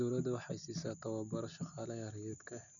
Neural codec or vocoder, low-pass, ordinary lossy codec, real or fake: none; 7.2 kHz; none; real